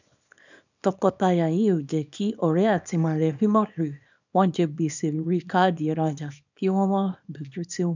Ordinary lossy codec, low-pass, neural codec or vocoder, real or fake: none; 7.2 kHz; codec, 24 kHz, 0.9 kbps, WavTokenizer, small release; fake